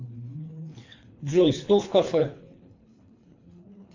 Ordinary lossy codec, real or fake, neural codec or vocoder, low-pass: AAC, 48 kbps; fake; codec, 24 kHz, 3 kbps, HILCodec; 7.2 kHz